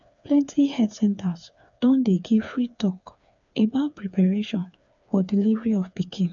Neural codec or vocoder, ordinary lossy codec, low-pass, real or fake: codec, 16 kHz, 4 kbps, FreqCodec, smaller model; none; 7.2 kHz; fake